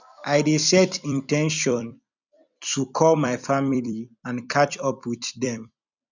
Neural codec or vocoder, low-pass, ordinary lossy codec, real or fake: vocoder, 44.1 kHz, 128 mel bands every 256 samples, BigVGAN v2; 7.2 kHz; none; fake